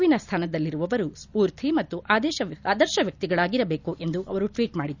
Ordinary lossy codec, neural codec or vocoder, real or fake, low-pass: none; none; real; 7.2 kHz